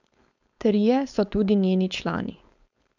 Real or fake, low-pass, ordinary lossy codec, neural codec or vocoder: fake; 7.2 kHz; none; codec, 16 kHz, 4.8 kbps, FACodec